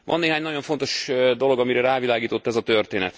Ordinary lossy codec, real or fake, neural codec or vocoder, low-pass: none; real; none; none